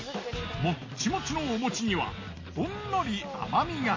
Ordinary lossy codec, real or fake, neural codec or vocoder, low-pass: MP3, 32 kbps; real; none; 7.2 kHz